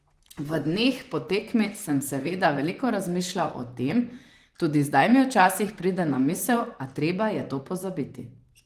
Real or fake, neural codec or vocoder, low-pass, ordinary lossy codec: fake; vocoder, 44.1 kHz, 128 mel bands, Pupu-Vocoder; 14.4 kHz; Opus, 24 kbps